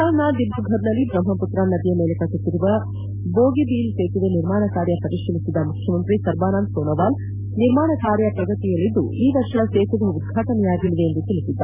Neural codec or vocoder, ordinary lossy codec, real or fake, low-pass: none; none; real; 3.6 kHz